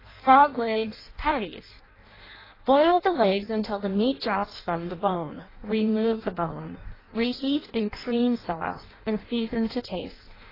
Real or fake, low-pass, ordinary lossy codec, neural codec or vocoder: fake; 5.4 kHz; AAC, 24 kbps; codec, 16 kHz in and 24 kHz out, 0.6 kbps, FireRedTTS-2 codec